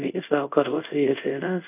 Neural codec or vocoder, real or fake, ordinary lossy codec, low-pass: codec, 24 kHz, 0.5 kbps, DualCodec; fake; none; 3.6 kHz